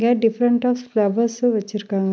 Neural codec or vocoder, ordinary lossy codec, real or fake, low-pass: none; none; real; none